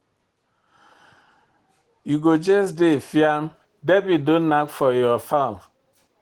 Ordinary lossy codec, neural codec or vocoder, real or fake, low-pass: Opus, 16 kbps; none; real; 19.8 kHz